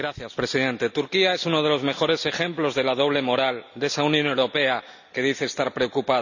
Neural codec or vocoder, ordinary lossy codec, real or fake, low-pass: none; none; real; 7.2 kHz